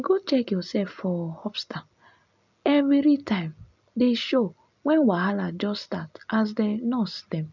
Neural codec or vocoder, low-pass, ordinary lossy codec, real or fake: none; 7.2 kHz; none; real